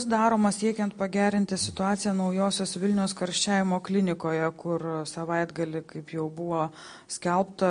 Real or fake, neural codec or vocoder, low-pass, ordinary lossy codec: real; none; 9.9 kHz; MP3, 48 kbps